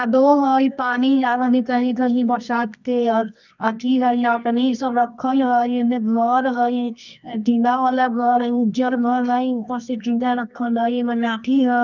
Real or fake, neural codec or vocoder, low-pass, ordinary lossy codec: fake; codec, 24 kHz, 0.9 kbps, WavTokenizer, medium music audio release; 7.2 kHz; none